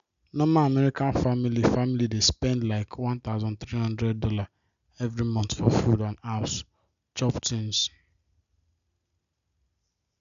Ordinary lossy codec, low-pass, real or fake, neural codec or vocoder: none; 7.2 kHz; real; none